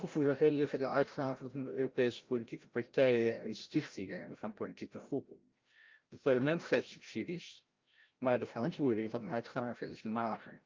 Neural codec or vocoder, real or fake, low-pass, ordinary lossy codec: codec, 16 kHz, 0.5 kbps, FreqCodec, larger model; fake; 7.2 kHz; Opus, 24 kbps